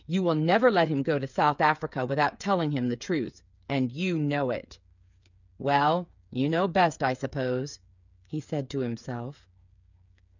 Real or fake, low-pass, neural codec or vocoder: fake; 7.2 kHz; codec, 16 kHz, 8 kbps, FreqCodec, smaller model